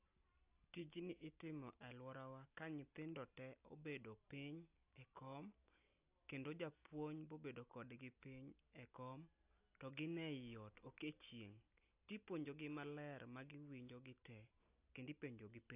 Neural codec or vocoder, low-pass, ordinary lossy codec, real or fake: none; 3.6 kHz; AAC, 32 kbps; real